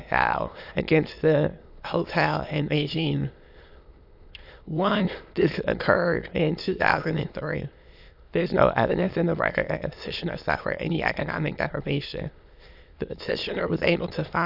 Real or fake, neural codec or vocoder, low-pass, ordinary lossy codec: fake; autoencoder, 22.05 kHz, a latent of 192 numbers a frame, VITS, trained on many speakers; 5.4 kHz; AAC, 48 kbps